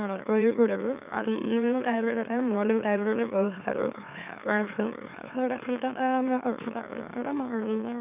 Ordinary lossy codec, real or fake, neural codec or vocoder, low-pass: none; fake; autoencoder, 44.1 kHz, a latent of 192 numbers a frame, MeloTTS; 3.6 kHz